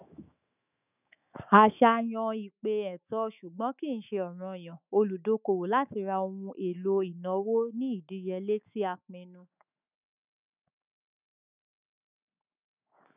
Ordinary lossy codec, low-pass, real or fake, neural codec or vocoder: none; 3.6 kHz; fake; autoencoder, 48 kHz, 128 numbers a frame, DAC-VAE, trained on Japanese speech